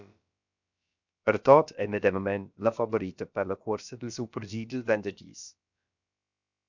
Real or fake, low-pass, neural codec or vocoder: fake; 7.2 kHz; codec, 16 kHz, about 1 kbps, DyCAST, with the encoder's durations